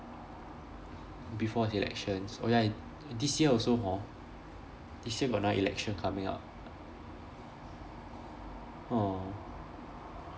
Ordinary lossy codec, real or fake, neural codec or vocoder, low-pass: none; real; none; none